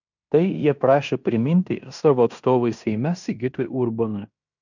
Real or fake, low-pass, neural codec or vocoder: fake; 7.2 kHz; codec, 16 kHz in and 24 kHz out, 0.9 kbps, LongCat-Audio-Codec, fine tuned four codebook decoder